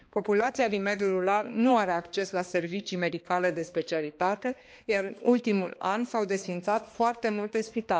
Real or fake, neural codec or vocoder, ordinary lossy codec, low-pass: fake; codec, 16 kHz, 2 kbps, X-Codec, HuBERT features, trained on balanced general audio; none; none